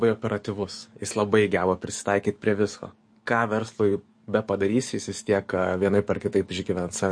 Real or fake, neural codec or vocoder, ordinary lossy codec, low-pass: fake; codec, 44.1 kHz, 7.8 kbps, DAC; MP3, 48 kbps; 9.9 kHz